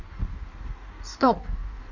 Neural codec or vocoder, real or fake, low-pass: codec, 16 kHz in and 24 kHz out, 1.1 kbps, FireRedTTS-2 codec; fake; 7.2 kHz